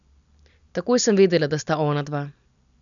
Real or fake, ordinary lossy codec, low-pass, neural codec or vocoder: real; none; 7.2 kHz; none